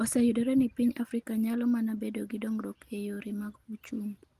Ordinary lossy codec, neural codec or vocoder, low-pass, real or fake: Opus, 24 kbps; none; 14.4 kHz; real